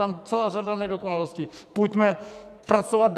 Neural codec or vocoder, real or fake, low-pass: codec, 44.1 kHz, 2.6 kbps, SNAC; fake; 14.4 kHz